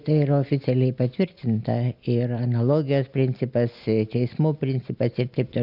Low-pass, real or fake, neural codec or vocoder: 5.4 kHz; real; none